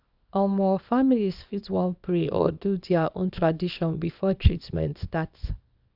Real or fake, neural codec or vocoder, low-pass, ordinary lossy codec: fake; codec, 24 kHz, 0.9 kbps, WavTokenizer, small release; 5.4 kHz; none